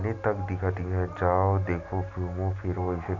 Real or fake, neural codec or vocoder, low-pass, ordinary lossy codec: real; none; 7.2 kHz; none